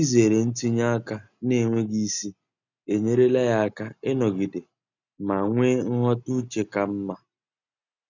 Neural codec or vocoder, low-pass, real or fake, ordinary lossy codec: none; 7.2 kHz; real; none